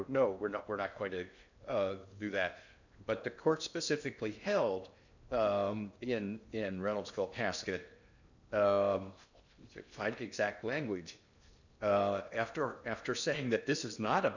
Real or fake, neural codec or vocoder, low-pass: fake; codec, 16 kHz in and 24 kHz out, 0.8 kbps, FocalCodec, streaming, 65536 codes; 7.2 kHz